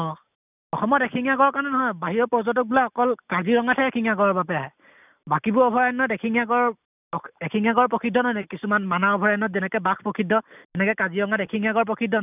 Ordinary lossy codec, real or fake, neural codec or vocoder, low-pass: none; real; none; 3.6 kHz